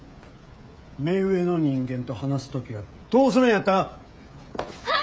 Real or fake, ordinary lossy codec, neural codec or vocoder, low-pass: fake; none; codec, 16 kHz, 16 kbps, FreqCodec, smaller model; none